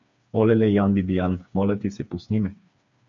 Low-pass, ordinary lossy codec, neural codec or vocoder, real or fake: 7.2 kHz; MP3, 64 kbps; codec, 16 kHz, 4 kbps, FreqCodec, smaller model; fake